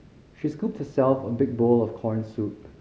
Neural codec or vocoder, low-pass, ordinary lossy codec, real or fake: none; none; none; real